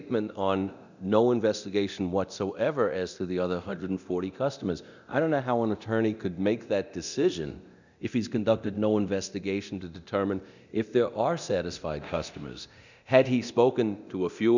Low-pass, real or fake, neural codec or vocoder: 7.2 kHz; fake; codec, 24 kHz, 0.9 kbps, DualCodec